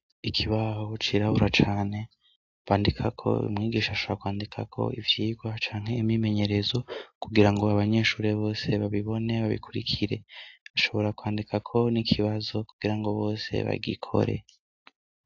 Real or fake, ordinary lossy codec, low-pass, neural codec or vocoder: real; AAC, 48 kbps; 7.2 kHz; none